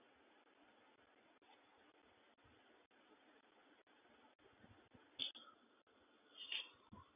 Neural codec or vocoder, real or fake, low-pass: none; real; 3.6 kHz